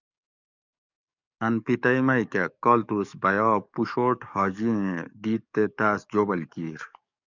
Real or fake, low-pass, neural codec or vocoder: fake; 7.2 kHz; codec, 44.1 kHz, 7.8 kbps, DAC